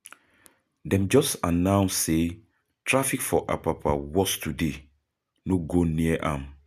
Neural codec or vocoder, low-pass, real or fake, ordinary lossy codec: none; 14.4 kHz; real; none